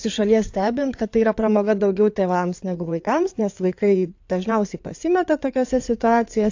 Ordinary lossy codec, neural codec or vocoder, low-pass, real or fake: AAC, 48 kbps; codec, 16 kHz in and 24 kHz out, 2.2 kbps, FireRedTTS-2 codec; 7.2 kHz; fake